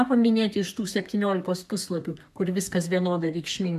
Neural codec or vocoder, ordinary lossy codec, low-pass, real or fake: codec, 32 kHz, 1.9 kbps, SNAC; MP3, 96 kbps; 14.4 kHz; fake